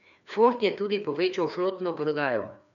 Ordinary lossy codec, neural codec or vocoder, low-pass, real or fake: none; codec, 16 kHz, 2 kbps, FreqCodec, larger model; 7.2 kHz; fake